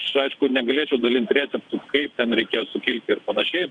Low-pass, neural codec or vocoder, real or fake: 9.9 kHz; vocoder, 22.05 kHz, 80 mel bands, WaveNeXt; fake